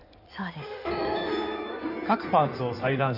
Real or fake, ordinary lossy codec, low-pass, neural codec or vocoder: fake; none; 5.4 kHz; codec, 16 kHz in and 24 kHz out, 2.2 kbps, FireRedTTS-2 codec